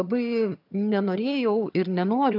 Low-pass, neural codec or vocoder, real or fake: 5.4 kHz; vocoder, 22.05 kHz, 80 mel bands, HiFi-GAN; fake